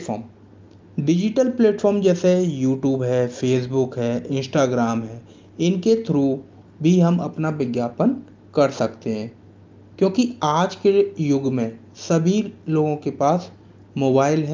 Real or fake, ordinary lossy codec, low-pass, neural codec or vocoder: real; Opus, 24 kbps; 7.2 kHz; none